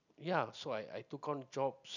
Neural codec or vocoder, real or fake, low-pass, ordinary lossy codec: none; real; 7.2 kHz; none